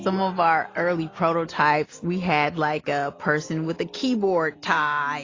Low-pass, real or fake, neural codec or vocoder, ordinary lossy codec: 7.2 kHz; real; none; AAC, 32 kbps